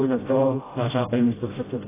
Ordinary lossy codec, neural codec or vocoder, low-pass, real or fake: AAC, 16 kbps; codec, 16 kHz, 0.5 kbps, FreqCodec, smaller model; 3.6 kHz; fake